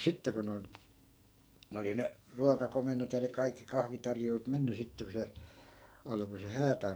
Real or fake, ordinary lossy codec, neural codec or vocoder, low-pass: fake; none; codec, 44.1 kHz, 2.6 kbps, SNAC; none